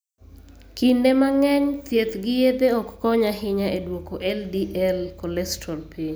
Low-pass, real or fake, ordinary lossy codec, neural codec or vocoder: none; real; none; none